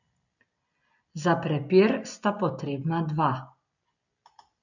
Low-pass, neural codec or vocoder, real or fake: 7.2 kHz; none; real